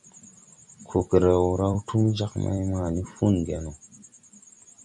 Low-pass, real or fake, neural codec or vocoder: 10.8 kHz; fake; vocoder, 44.1 kHz, 128 mel bands every 256 samples, BigVGAN v2